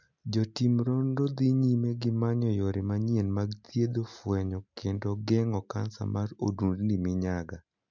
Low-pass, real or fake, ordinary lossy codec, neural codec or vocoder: 7.2 kHz; real; MP3, 64 kbps; none